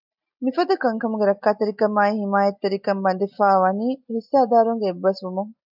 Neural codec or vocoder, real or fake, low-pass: none; real; 5.4 kHz